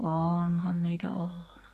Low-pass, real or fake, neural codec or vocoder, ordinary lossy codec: 14.4 kHz; fake; codec, 44.1 kHz, 2.6 kbps, SNAC; AAC, 64 kbps